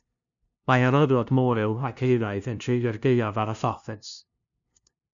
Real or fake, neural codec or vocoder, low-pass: fake; codec, 16 kHz, 0.5 kbps, FunCodec, trained on LibriTTS, 25 frames a second; 7.2 kHz